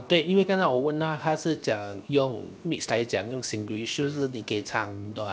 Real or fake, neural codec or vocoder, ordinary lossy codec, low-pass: fake; codec, 16 kHz, 0.7 kbps, FocalCodec; none; none